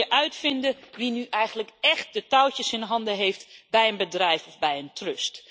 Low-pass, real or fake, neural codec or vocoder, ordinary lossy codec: none; real; none; none